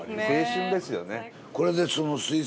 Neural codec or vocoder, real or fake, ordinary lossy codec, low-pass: none; real; none; none